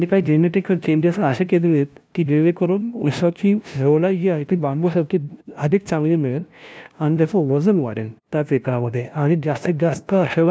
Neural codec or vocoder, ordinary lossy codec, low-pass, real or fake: codec, 16 kHz, 0.5 kbps, FunCodec, trained on LibriTTS, 25 frames a second; none; none; fake